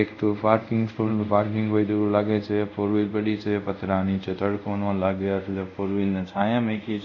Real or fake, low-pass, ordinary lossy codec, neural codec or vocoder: fake; 7.2 kHz; none; codec, 24 kHz, 0.5 kbps, DualCodec